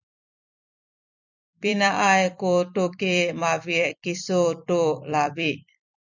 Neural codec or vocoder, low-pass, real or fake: vocoder, 44.1 kHz, 128 mel bands every 256 samples, BigVGAN v2; 7.2 kHz; fake